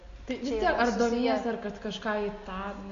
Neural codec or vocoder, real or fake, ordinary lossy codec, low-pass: none; real; MP3, 48 kbps; 7.2 kHz